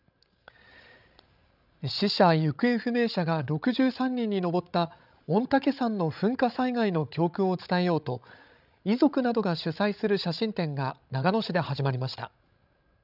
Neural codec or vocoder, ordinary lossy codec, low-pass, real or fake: codec, 16 kHz, 16 kbps, FreqCodec, larger model; none; 5.4 kHz; fake